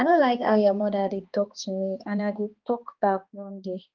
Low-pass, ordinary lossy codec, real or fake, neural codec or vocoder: 7.2 kHz; Opus, 32 kbps; fake; codec, 16 kHz, 2 kbps, X-Codec, HuBERT features, trained on balanced general audio